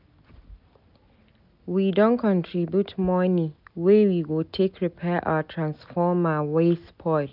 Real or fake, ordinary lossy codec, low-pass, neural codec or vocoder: real; none; 5.4 kHz; none